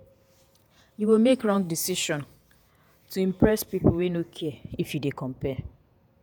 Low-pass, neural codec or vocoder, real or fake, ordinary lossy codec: none; vocoder, 48 kHz, 128 mel bands, Vocos; fake; none